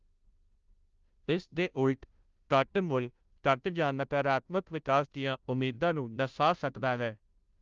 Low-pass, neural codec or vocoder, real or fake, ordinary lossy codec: 7.2 kHz; codec, 16 kHz, 0.5 kbps, FunCodec, trained on Chinese and English, 25 frames a second; fake; Opus, 24 kbps